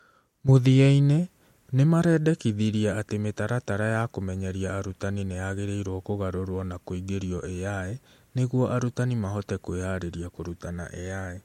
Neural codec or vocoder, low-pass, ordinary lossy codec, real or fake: none; 19.8 kHz; MP3, 64 kbps; real